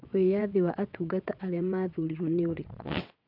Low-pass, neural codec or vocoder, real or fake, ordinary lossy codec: 5.4 kHz; vocoder, 22.05 kHz, 80 mel bands, WaveNeXt; fake; none